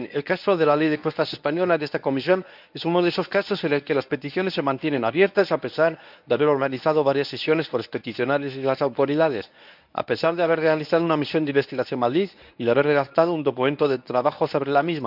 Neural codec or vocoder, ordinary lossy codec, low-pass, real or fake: codec, 24 kHz, 0.9 kbps, WavTokenizer, medium speech release version 1; none; 5.4 kHz; fake